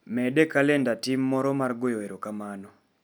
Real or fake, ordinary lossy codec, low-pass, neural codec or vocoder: real; none; none; none